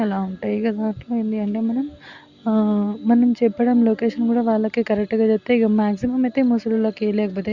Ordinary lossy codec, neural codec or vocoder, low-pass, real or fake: Opus, 64 kbps; none; 7.2 kHz; real